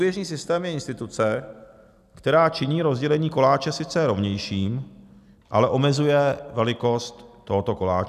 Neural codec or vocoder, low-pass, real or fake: none; 14.4 kHz; real